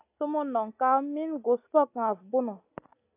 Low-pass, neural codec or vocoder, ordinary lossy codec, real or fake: 3.6 kHz; none; AAC, 24 kbps; real